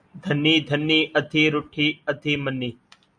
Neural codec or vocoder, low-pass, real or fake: none; 9.9 kHz; real